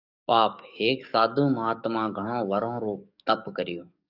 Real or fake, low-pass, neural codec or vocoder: fake; 5.4 kHz; codec, 44.1 kHz, 7.8 kbps, DAC